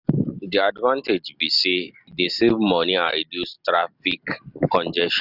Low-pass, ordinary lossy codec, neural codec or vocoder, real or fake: 5.4 kHz; none; none; real